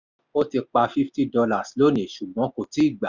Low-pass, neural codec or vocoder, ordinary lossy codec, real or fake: 7.2 kHz; none; none; real